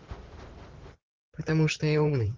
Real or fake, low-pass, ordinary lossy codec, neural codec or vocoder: fake; 7.2 kHz; Opus, 16 kbps; vocoder, 44.1 kHz, 128 mel bands every 512 samples, BigVGAN v2